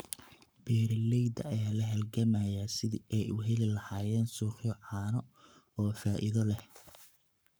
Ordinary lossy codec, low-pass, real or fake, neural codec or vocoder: none; none; fake; codec, 44.1 kHz, 7.8 kbps, Pupu-Codec